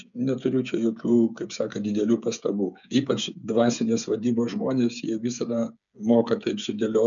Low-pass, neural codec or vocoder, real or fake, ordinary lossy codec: 7.2 kHz; codec, 16 kHz, 8 kbps, FreqCodec, smaller model; fake; MP3, 96 kbps